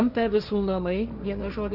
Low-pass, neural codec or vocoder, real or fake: 5.4 kHz; codec, 16 kHz, 1.1 kbps, Voila-Tokenizer; fake